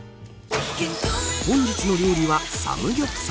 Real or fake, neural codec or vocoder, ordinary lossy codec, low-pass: real; none; none; none